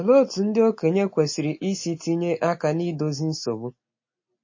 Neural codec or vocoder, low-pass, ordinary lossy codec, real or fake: none; 7.2 kHz; MP3, 32 kbps; real